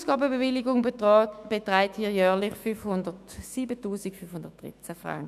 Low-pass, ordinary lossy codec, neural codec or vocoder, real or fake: 14.4 kHz; none; autoencoder, 48 kHz, 128 numbers a frame, DAC-VAE, trained on Japanese speech; fake